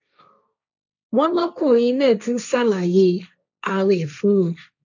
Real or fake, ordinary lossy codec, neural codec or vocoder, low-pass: fake; none; codec, 16 kHz, 1.1 kbps, Voila-Tokenizer; 7.2 kHz